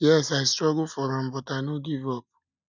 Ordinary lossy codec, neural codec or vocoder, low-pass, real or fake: none; none; 7.2 kHz; real